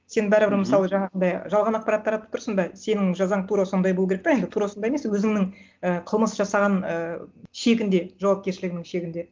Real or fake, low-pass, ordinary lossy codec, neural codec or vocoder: real; 7.2 kHz; Opus, 32 kbps; none